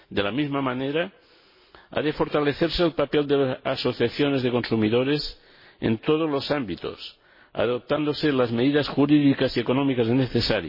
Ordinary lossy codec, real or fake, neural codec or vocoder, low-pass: MP3, 24 kbps; real; none; 5.4 kHz